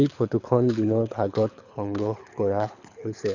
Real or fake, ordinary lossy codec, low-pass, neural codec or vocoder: fake; none; 7.2 kHz; codec, 24 kHz, 6 kbps, HILCodec